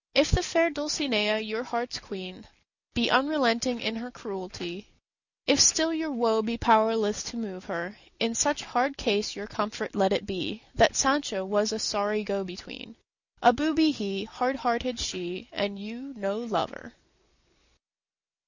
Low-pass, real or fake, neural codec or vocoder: 7.2 kHz; real; none